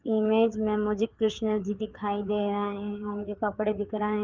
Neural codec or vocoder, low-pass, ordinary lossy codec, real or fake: codec, 16 kHz, 4 kbps, FreqCodec, larger model; 7.2 kHz; Opus, 32 kbps; fake